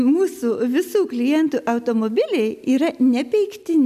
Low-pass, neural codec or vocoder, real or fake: 14.4 kHz; none; real